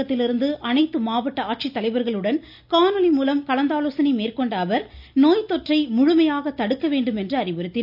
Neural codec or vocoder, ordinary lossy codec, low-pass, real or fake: none; none; 5.4 kHz; real